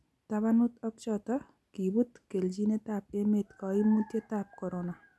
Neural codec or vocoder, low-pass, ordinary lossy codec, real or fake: none; none; none; real